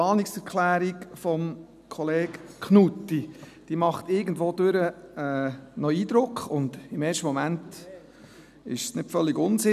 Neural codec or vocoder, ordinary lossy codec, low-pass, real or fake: none; none; 14.4 kHz; real